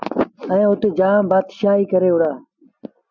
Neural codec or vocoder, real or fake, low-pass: none; real; 7.2 kHz